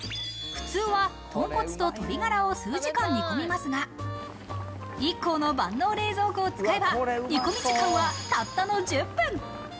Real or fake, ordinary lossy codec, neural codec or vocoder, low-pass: real; none; none; none